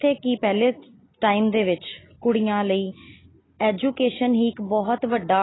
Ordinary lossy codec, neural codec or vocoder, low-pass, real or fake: AAC, 16 kbps; none; 7.2 kHz; real